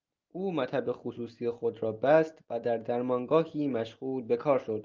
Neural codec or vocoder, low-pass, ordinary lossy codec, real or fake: none; 7.2 kHz; Opus, 32 kbps; real